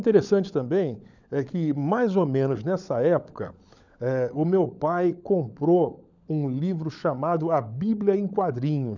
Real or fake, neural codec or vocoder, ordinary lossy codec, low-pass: fake; codec, 16 kHz, 16 kbps, FunCodec, trained on LibriTTS, 50 frames a second; none; 7.2 kHz